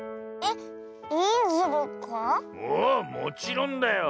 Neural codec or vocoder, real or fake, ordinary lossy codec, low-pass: none; real; none; none